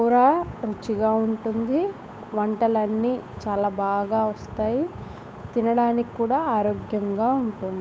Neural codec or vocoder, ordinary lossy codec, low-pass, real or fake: codec, 16 kHz, 8 kbps, FunCodec, trained on Chinese and English, 25 frames a second; none; none; fake